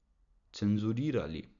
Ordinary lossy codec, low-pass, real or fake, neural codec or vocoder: none; 7.2 kHz; real; none